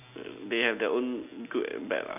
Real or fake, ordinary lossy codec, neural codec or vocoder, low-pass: real; none; none; 3.6 kHz